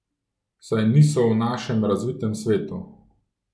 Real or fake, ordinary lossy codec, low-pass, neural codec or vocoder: real; none; none; none